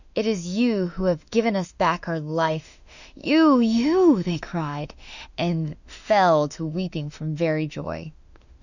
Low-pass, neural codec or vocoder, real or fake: 7.2 kHz; autoencoder, 48 kHz, 32 numbers a frame, DAC-VAE, trained on Japanese speech; fake